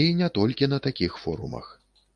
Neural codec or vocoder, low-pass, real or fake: none; 9.9 kHz; real